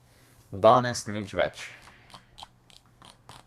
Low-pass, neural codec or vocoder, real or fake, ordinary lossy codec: 14.4 kHz; codec, 32 kHz, 1.9 kbps, SNAC; fake; Opus, 64 kbps